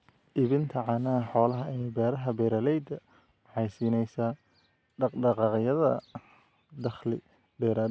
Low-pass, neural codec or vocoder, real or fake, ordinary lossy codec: none; none; real; none